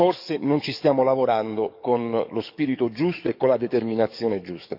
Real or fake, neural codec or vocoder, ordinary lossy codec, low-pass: fake; codec, 44.1 kHz, 7.8 kbps, DAC; none; 5.4 kHz